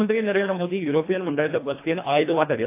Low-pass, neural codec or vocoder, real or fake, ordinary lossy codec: 3.6 kHz; codec, 24 kHz, 1.5 kbps, HILCodec; fake; AAC, 24 kbps